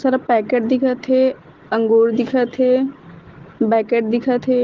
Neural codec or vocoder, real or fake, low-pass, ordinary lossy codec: none; real; 7.2 kHz; Opus, 16 kbps